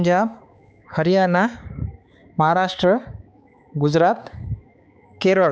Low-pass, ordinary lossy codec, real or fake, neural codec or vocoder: none; none; fake; codec, 16 kHz, 4 kbps, X-Codec, HuBERT features, trained on balanced general audio